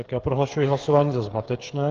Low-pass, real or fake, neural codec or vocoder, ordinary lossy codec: 7.2 kHz; fake; codec, 16 kHz, 8 kbps, FreqCodec, smaller model; Opus, 16 kbps